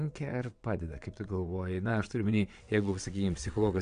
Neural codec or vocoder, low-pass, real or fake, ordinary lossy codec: vocoder, 22.05 kHz, 80 mel bands, WaveNeXt; 9.9 kHz; fake; AAC, 64 kbps